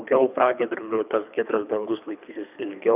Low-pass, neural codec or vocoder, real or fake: 3.6 kHz; codec, 24 kHz, 3 kbps, HILCodec; fake